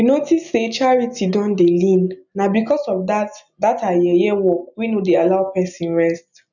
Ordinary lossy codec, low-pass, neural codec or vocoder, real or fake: none; 7.2 kHz; none; real